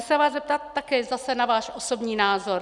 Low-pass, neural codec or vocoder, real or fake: 10.8 kHz; none; real